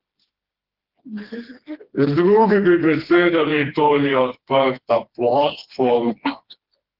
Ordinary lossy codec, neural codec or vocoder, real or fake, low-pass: Opus, 16 kbps; codec, 16 kHz, 2 kbps, FreqCodec, smaller model; fake; 5.4 kHz